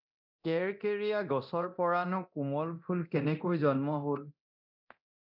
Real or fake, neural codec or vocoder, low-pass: fake; codec, 24 kHz, 0.9 kbps, DualCodec; 5.4 kHz